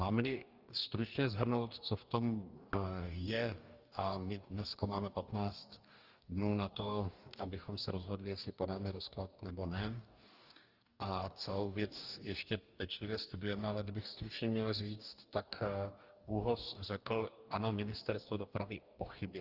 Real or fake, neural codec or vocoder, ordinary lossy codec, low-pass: fake; codec, 44.1 kHz, 2.6 kbps, DAC; Opus, 24 kbps; 5.4 kHz